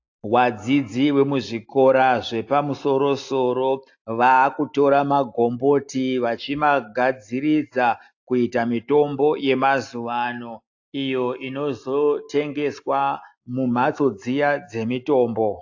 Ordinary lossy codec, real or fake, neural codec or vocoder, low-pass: AAC, 48 kbps; real; none; 7.2 kHz